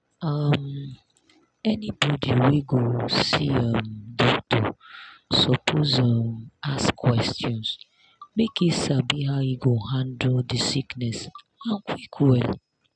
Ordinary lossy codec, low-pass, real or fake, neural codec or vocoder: none; 9.9 kHz; real; none